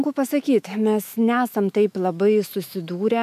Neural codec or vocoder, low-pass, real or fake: autoencoder, 48 kHz, 128 numbers a frame, DAC-VAE, trained on Japanese speech; 14.4 kHz; fake